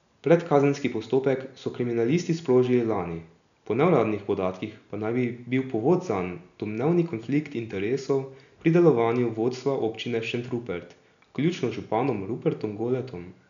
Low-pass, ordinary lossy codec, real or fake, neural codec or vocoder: 7.2 kHz; none; real; none